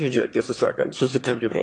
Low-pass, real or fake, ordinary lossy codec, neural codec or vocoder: 9.9 kHz; fake; AAC, 48 kbps; autoencoder, 22.05 kHz, a latent of 192 numbers a frame, VITS, trained on one speaker